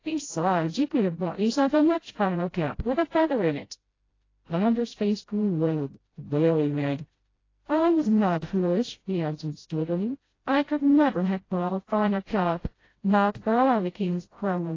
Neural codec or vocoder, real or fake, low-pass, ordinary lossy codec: codec, 16 kHz, 0.5 kbps, FreqCodec, smaller model; fake; 7.2 kHz; AAC, 32 kbps